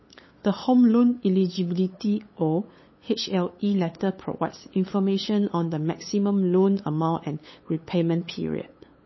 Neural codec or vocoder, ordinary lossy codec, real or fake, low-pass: codec, 16 kHz, 8 kbps, FunCodec, trained on LibriTTS, 25 frames a second; MP3, 24 kbps; fake; 7.2 kHz